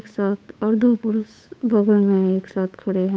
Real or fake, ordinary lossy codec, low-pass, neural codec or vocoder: fake; none; none; codec, 16 kHz, 8 kbps, FunCodec, trained on Chinese and English, 25 frames a second